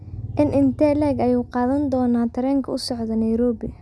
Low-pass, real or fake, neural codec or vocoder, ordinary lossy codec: none; real; none; none